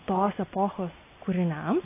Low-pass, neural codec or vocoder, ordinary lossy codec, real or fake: 3.6 kHz; none; AAC, 24 kbps; real